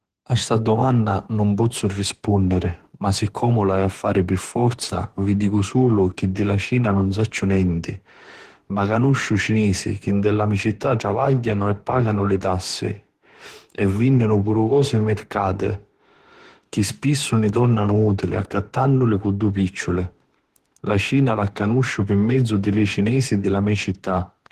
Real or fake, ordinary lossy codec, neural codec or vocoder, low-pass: fake; Opus, 16 kbps; autoencoder, 48 kHz, 32 numbers a frame, DAC-VAE, trained on Japanese speech; 14.4 kHz